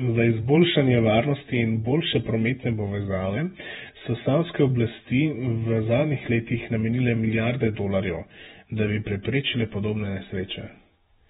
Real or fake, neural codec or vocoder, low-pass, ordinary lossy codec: fake; vocoder, 48 kHz, 128 mel bands, Vocos; 19.8 kHz; AAC, 16 kbps